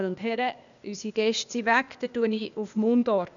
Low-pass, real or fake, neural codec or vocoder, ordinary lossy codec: 7.2 kHz; fake; codec, 16 kHz, 0.8 kbps, ZipCodec; none